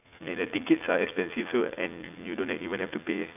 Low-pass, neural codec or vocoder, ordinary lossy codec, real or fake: 3.6 kHz; vocoder, 44.1 kHz, 80 mel bands, Vocos; none; fake